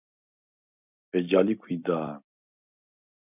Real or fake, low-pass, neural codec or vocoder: real; 3.6 kHz; none